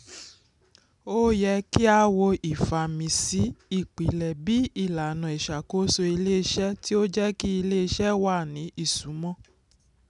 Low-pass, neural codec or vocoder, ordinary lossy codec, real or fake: 10.8 kHz; none; none; real